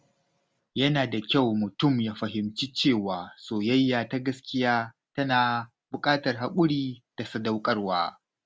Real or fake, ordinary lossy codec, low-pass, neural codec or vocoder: real; none; none; none